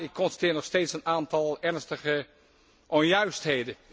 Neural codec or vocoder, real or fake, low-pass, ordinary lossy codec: none; real; none; none